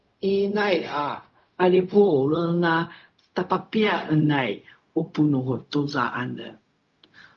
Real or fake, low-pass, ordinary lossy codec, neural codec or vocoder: fake; 7.2 kHz; Opus, 32 kbps; codec, 16 kHz, 0.4 kbps, LongCat-Audio-Codec